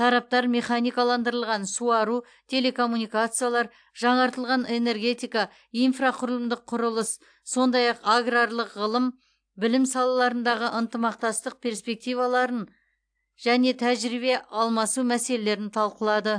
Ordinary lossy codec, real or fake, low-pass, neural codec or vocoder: AAC, 64 kbps; real; 9.9 kHz; none